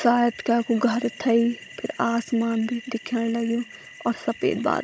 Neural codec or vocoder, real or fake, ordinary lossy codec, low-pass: codec, 16 kHz, 16 kbps, FunCodec, trained on Chinese and English, 50 frames a second; fake; none; none